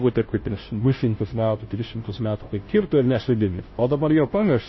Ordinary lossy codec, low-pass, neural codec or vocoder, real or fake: MP3, 24 kbps; 7.2 kHz; codec, 16 kHz, 0.5 kbps, FunCodec, trained on Chinese and English, 25 frames a second; fake